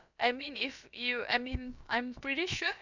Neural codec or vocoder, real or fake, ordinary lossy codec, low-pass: codec, 16 kHz, about 1 kbps, DyCAST, with the encoder's durations; fake; none; 7.2 kHz